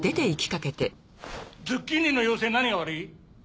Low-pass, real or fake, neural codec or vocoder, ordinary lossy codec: none; real; none; none